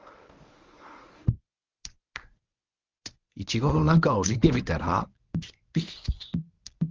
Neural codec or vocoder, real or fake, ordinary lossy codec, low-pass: codec, 24 kHz, 0.9 kbps, WavTokenizer, medium speech release version 1; fake; Opus, 32 kbps; 7.2 kHz